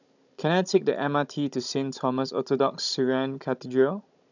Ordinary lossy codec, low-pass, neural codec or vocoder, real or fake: none; 7.2 kHz; codec, 16 kHz, 16 kbps, FunCodec, trained on Chinese and English, 50 frames a second; fake